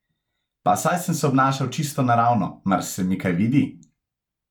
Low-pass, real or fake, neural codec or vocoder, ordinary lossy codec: 19.8 kHz; real; none; none